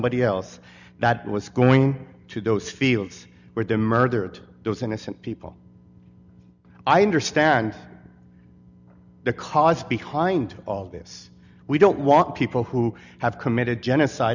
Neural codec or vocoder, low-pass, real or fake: none; 7.2 kHz; real